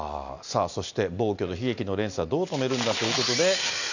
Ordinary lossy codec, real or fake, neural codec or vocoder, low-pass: none; real; none; 7.2 kHz